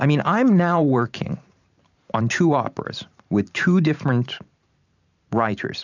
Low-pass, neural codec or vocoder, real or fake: 7.2 kHz; vocoder, 44.1 kHz, 128 mel bands every 512 samples, BigVGAN v2; fake